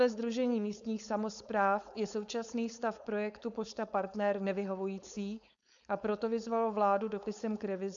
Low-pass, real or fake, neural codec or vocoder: 7.2 kHz; fake; codec, 16 kHz, 4.8 kbps, FACodec